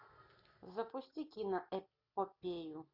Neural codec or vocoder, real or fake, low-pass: none; real; 5.4 kHz